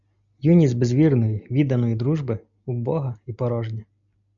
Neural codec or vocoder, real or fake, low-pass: none; real; 7.2 kHz